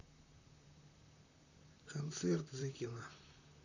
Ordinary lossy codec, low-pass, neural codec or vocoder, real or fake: none; 7.2 kHz; vocoder, 44.1 kHz, 128 mel bands, Pupu-Vocoder; fake